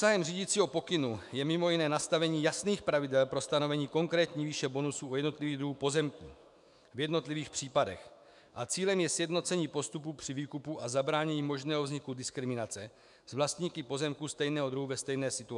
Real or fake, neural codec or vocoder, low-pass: fake; autoencoder, 48 kHz, 128 numbers a frame, DAC-VAE, trained on Japanese speech; 10.8 kHz